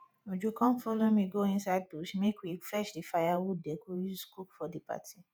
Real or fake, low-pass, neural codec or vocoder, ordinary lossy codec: fake; none; vocoder, 48 kHz, 128 mel bands, Vocos; none